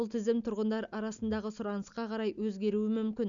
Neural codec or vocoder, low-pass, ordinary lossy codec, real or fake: none; 7.2 kHz; none; real